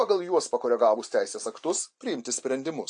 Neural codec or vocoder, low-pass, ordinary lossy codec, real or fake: none; 9.9 kHz; AAC, 64 kbps; real